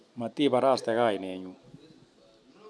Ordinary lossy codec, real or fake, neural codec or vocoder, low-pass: none; real; none; none